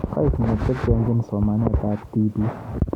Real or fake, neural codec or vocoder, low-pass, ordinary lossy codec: real; none; 19.8 kHz; none